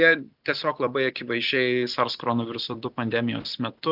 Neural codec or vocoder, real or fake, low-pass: none; real; 5.4 kHz